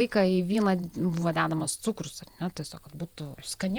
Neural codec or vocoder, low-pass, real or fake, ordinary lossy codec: vocoder, 48 kHz, 128 mel bands, Vocos; 19.8 kHz; fake; Opus, 64 kbps